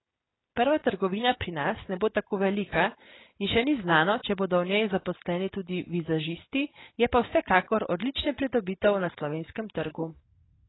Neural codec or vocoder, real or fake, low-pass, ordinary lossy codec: none; real; 7.2 kHz; AAC, 16 kbps